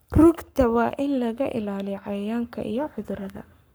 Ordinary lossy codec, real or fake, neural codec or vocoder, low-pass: none; fake; codec, 44.1 kHz, 7.8 kbps, Pupu-Codec; none